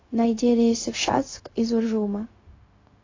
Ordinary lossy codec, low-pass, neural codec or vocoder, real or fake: AAC, 32 kbps; 7.2 kHz; codec, 16 kHz, 0.4 kbps, LongCat-Audio-Codec; fake